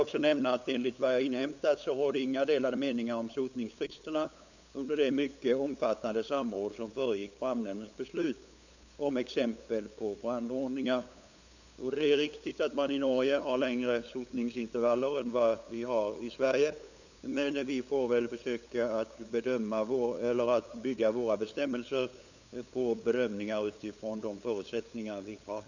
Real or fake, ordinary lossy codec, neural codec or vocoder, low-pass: fake; none; codec, 16 kHz, 8 kbps, FunCodec, trained on LibriTTS, 25 frames a second; 7.2 kHz